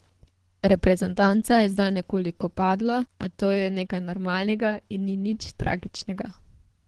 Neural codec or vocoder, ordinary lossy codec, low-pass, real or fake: codec, 24 kHz, 3 kbps, HILCodec; Opus, 16 kbps; 10.8 kHz; fake